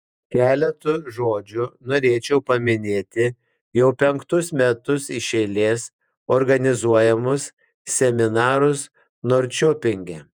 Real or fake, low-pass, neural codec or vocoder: fake; 19.8 kHz; vocoder, 48 kHz, 128 mel bands, Vocos